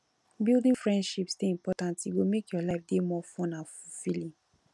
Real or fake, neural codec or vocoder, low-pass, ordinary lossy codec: real; none; none; none